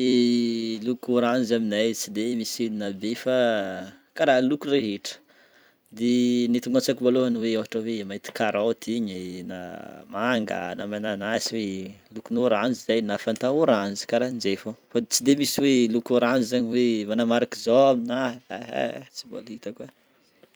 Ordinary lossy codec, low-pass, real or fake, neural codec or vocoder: none; none; fake; vocoder, 44.1 kHz, 128 mel bands every 256 samples, BigVGAN v2